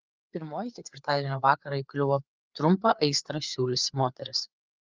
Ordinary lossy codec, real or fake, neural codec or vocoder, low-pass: Opus, 32 kbps; fake; codec, 16 kHz, 4 kbps, FreqCodec, larger model; 7.2 kHz